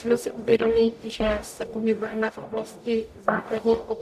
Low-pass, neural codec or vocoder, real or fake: 14.4 kHz; codec, 44.1 kHz, 0.9 kbps, DAC; fake